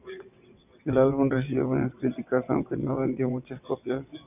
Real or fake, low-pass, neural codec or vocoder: fake; 3.6 kHz; vocoder, 22.05 kHz, 80 mel bands, WaveNeXt